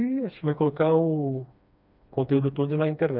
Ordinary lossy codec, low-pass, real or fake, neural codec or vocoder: none; 5.4 kHz; fake; codec, 16 kHz, 2 kbps, FreqCodec, smaller model